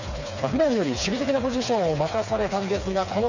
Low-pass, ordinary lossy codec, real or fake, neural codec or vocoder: 7.2 kHz; none; fake; codec, 16 kHz, 4 kbps, FreqCodec, smaller model